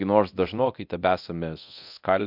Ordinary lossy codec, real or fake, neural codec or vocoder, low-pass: MP3, 48 kbps; fake; codec, 24 kHz, 0.9 kbps, DualCodec; 5.4 kHz